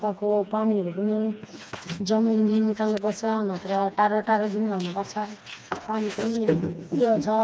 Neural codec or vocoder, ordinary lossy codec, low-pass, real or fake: codec, 16 kHz, 2 kbps, FreqCodec, smaller model; none; none; fake